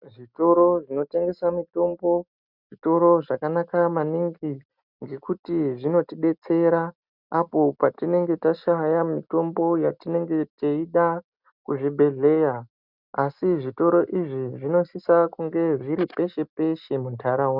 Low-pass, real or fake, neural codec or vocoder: 5.4 kHz; real; none